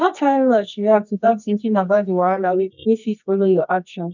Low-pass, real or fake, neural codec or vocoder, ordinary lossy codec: 7.2 kHz; fake; codec, 24 kHz, 0.9 kbps, WavTokenizer, medium music audio release; none